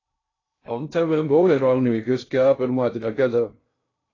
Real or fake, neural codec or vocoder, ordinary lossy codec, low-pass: fake; codec, 16 kHz in and 24 kHz out, 0.6 kbps, FocalCodec, streaming, 2048 codes; AAC, 32 kbps; 7.2 kHz